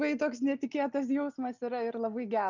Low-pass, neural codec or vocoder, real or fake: 7.2 kHz; none; real